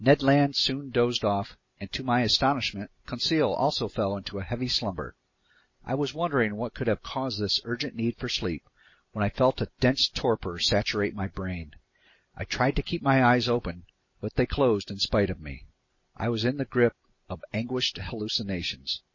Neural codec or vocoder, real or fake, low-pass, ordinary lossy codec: none; real; 7.2 kHz; MP3, 32 kbps